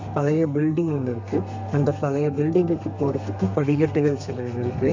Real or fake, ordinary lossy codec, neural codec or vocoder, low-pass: fake; MP3, 64 kbps; codec, 32 kHz, 1.9 kbps, SNAC; 7.2 kHz